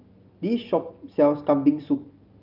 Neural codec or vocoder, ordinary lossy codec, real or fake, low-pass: none; Opus, 32 kbps; real; 5.4 kHz